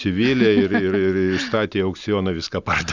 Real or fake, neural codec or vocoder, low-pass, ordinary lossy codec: real; none; 7.2 kHz; Opus, 64 kbps